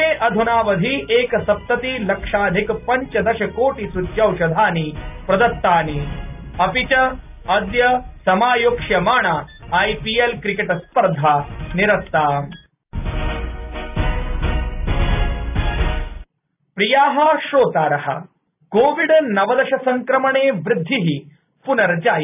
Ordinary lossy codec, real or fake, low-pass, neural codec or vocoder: none; real; 3.6 kHz; none